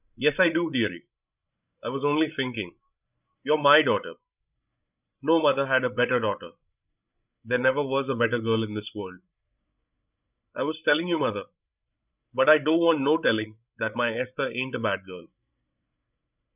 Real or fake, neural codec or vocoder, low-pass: fake; codec, 16 kHz, 16 kbps, FreqCodec, larger model; 3.6 kHz